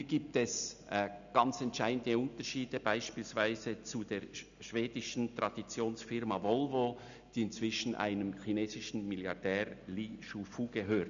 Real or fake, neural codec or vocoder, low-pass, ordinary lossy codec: real; none; 7.2 kHz; none